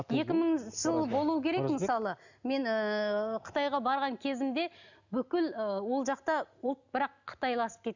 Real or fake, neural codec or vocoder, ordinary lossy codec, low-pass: real; none; none; 7.2 kHz